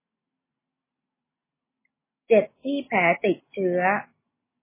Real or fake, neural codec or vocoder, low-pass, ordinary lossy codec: real; none; 3.6 kHz; MP3, 16 kbps